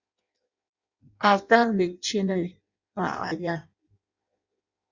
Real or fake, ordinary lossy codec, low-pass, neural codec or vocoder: fake; Opus, 64 kbps; 7.2 kHz; codec, 16 kHz in and 24 kHz out, 0.6 kbps, FireRedTTS-2 codec